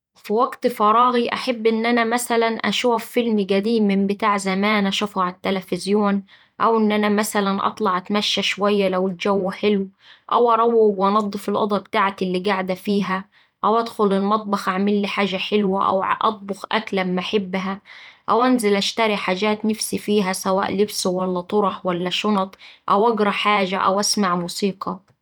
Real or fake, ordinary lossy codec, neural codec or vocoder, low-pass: fake; none; vocoder, 44.1 kHz, 128 mel bands every 512 samples, BigVGAN v2; 19.8 kHz